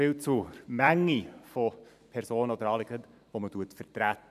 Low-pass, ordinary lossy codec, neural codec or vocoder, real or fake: 14.4 kHz; none; vocoder, 44.1 kHz, 128 mel bands every 512 samples, BigVGAN v2; fake